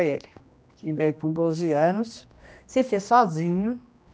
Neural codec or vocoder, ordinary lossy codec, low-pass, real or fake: codec, 16 kHz, 1 kbps, X-Codec, HuBERT features, trained on general audio; none; none; fake